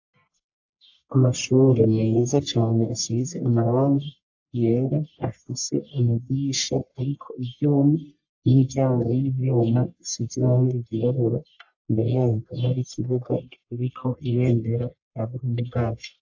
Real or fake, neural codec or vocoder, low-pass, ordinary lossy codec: fake; codec, 44.1 kHz, 1.7 kbps, Pupu-Codec; 7.2 kHz; AAC, 48 kbps